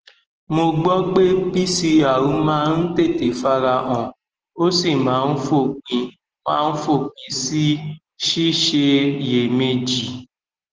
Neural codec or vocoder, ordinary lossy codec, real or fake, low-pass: none; Opus, 16 kbps; real; 7.2 kHz